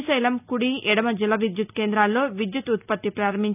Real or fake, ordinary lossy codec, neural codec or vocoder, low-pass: real; none; none; 3.6 kHz